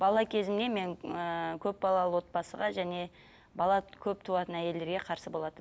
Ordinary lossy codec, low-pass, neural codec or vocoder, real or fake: none; none; none; real